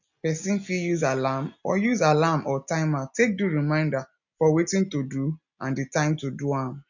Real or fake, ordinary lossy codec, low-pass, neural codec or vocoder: real; none; 7.2 kHz; none